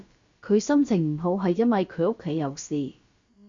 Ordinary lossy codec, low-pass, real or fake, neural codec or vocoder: Opus, 64 kbps; 7.2 kHz; fake; codec, 16 kHz, about 1 kbps, DyCAST, with the encoder's durations